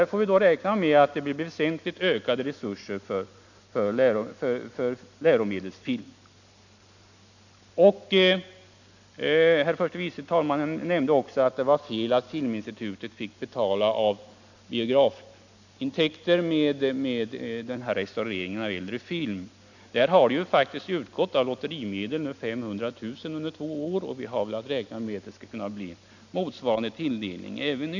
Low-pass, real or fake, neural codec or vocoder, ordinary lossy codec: 7.2 kHz; real; none; none